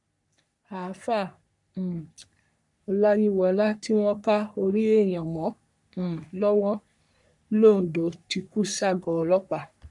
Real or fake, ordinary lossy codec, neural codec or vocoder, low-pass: fake; none; codec, 44.1 kHz, 3.4 kbps, Pupu-Codec; 10.8 kHz